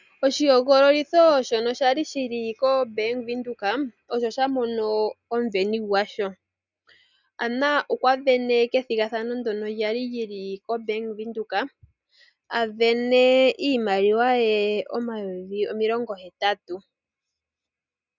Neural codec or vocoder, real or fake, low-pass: none; real; 7.2 kHz